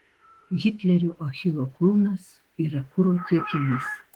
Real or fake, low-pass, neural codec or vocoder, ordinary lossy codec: fake; 19.8 kHz; autoencoder, 48 kHz, 32 numbers a frame, DAC-VAE, trained on Japanese speech; Opus, 16 kbps